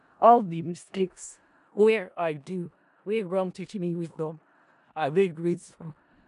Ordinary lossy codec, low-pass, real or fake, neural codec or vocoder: none; 10.8 kHz; fake; codec, 16 kHz in and 24 kHz out, 0.4 kbps, LongCat-Audio-Codec, four codebook decoder